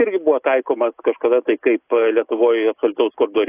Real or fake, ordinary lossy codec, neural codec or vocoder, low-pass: real; AAC, 24 kbps; none; 3.6 kHz